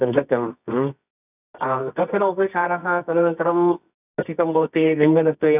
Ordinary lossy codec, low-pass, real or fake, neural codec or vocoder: none; 3.6 kHz; fake; codec, 24 kHz, 0.9 kbps, WavTokenizer, medium music audio release